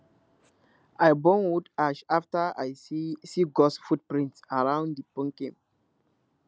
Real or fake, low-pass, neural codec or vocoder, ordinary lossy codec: real; none; none; none